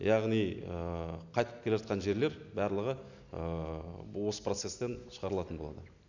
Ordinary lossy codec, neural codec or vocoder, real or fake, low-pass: none; none; real; 7.2 kHz